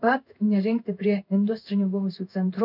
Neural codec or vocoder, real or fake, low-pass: codec, 16 kHz in and 24 kHz out, 1 kbps, XY-Tokenizer; fake; 5.4 kHz